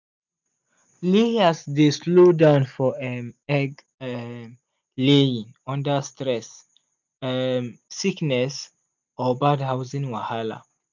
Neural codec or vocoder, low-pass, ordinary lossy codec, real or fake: none; 7.2 kHz; none; real